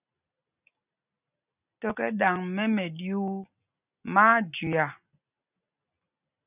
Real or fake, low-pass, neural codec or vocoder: real; 3.6 kHz; none